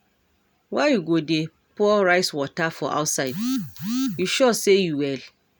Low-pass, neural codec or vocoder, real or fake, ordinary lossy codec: none; none; real; none